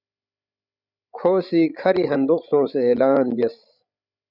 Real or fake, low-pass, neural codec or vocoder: fake; 5.4 kHz; codec, 16 kHz, 16 kbps, FreqCodec, larger model